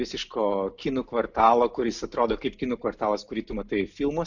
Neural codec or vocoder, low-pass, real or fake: none; 7.2 kHz; real